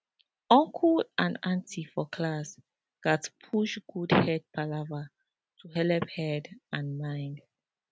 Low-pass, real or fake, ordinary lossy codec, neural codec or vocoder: none; real; none; none